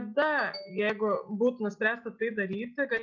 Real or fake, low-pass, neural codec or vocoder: real; 7.2 kHz; none